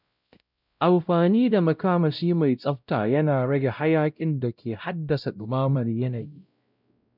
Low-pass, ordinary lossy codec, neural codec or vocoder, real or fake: 5.4 kHz; none; codec, 16 kHz, 0.5 kbps, X-Codec, WavLM features, trained on Multilingual LibriSpeech; fake